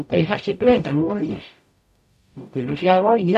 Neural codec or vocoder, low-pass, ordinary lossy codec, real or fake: codec, 44.1 kHz, 0.9 kbps, DAC; 14.4 kHz; none; fake